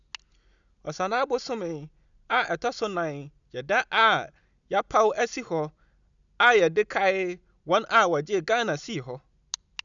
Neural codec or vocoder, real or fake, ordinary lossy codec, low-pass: none; real; none; 7.2 kHz